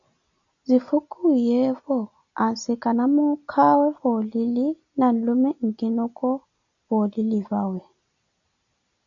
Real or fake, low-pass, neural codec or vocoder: real; 7.2 kHz; none